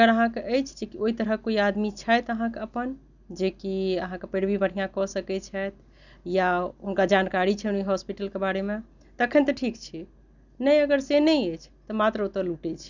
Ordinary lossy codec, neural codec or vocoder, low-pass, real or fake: none; none; 7.2 kHz; real